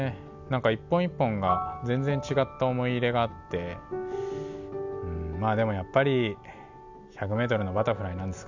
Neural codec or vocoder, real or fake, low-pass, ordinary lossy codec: none; real; 7.2 kHz; none